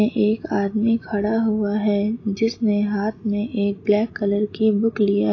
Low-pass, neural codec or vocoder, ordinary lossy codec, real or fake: 7.2 kHz; none; AAC, 32 kbps; real